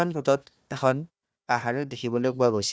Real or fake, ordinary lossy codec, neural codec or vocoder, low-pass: fake; none; codec, 16 kHz, 1 kbps, FunCodec, trained on Chinese and English, 50 frames a second; none